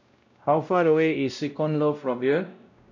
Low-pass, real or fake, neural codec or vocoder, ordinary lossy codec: 7.2 kHz; fake; codec, 16 kHz, 0.5 kbps, X-Codec, WavLM features, trained on Multilingual LibriSpeech; MP3, 64 kbps